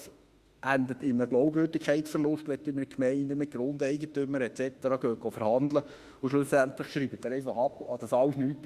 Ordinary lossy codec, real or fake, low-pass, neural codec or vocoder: Opus, 64 kbps; fake; 14.4 kHz; autoencoder, 48 kHz, 32 numbers a frame, DAC-VAE, trained on Japanese speech